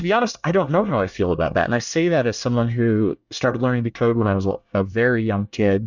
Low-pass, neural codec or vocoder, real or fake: 7.2 kHz; codec, 24 kHz, 1 kbps, SNAC; fake